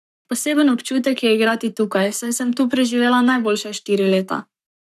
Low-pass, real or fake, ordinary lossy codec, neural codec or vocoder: 14.4 kHz; fake; none; codec, 44.1 kHz, 7.8 kbps, Pupu-Codec